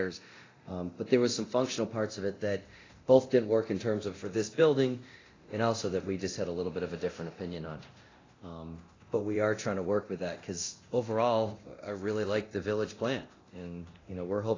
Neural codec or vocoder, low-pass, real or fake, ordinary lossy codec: codec, 24 kHz, 0.9 kbps, DualCodec; 7.2 kHz; fake; AAC, 32 kbps